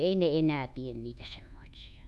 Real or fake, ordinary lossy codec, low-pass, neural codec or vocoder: fake; none; none; codec, 24 kHz, 1.2 kbps, DualCodec